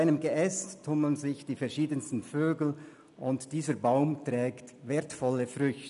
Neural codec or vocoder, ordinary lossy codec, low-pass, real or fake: none; none; 10.8 kHz; real